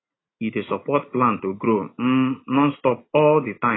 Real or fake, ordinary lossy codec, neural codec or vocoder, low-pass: real; AAC, 16 kbps; none; 7.2 kHz